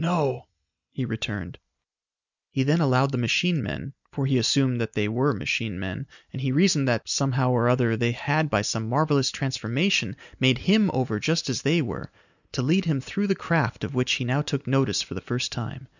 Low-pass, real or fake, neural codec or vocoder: 7.2 kHz; real; none